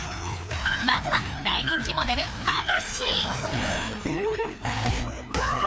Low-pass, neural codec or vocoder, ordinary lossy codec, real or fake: none; codec, 16 kHz, 2 kbps, FreqCodec, larger model; none; fake